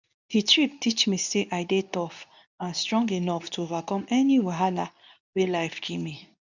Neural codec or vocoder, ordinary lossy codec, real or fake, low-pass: codec, 24 kHz, 0.9 kbps, WavTokenizer, medium speech release version 2; none; fake; 7.2 kHz